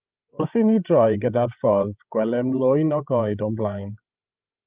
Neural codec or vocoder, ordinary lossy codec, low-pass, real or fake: codec, 16 kHz, 16 kbps, FreqCodec, larger model; Opus, 32 kbps; 3.6 kHz; fake